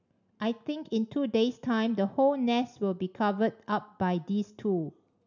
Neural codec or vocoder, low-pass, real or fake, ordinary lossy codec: none; 7.2 kHz; real; none